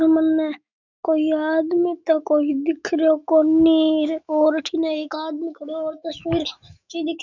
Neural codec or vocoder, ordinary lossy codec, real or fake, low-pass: autoencoder, 48 kHz, 128 numbers a frame, DAC-VAE, trained on Japanese speech; none; fake; 7.2 kHz